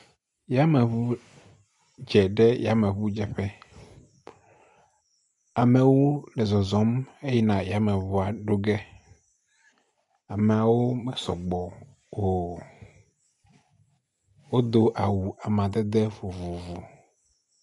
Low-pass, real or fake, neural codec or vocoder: 10.8 kHz; real; none